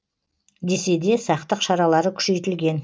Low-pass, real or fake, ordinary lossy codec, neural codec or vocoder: none; real; none; none